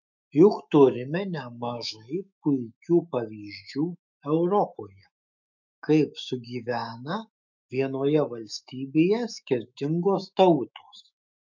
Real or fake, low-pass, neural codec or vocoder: fake; 7.2 kHz; autoencoder, 48 kHz, 128 numbers a frame, DAC-VAE, trained on Japanese speech